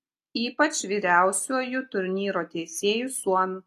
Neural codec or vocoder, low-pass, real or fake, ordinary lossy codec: none; 14.4 kHz; real; AAC, 48 kbps